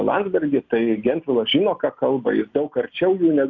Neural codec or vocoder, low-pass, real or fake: none; 7.2 kHz; real